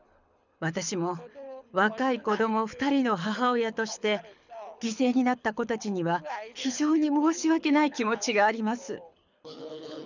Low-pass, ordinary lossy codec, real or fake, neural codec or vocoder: 7.2 kHz; none; fake; codec, 24 kHz, 6 kbps, HILCodec